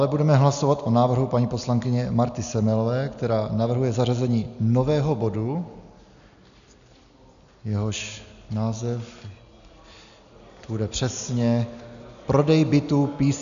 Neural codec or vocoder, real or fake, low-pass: none; real; 7.2 kHz